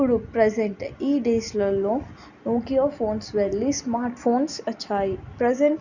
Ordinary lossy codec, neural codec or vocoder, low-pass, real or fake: none; none; 7.2 kHz; real